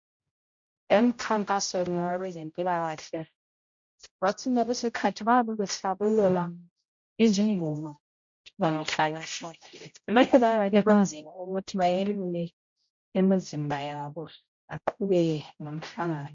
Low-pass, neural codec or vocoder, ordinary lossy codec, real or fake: 7.2 kHz; codec, 16 kHz, 0.5 kbps, X-Codec, HuBERT features, trained on general audio; MP3, 48 kbps; fake